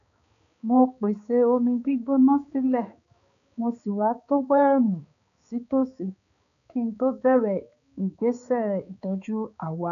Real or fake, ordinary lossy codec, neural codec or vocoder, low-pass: fake; none; codec, 16 kHz, 4 kbps, X-Codec, HuBERT features, trained on balanced general audio; 7.2 kHz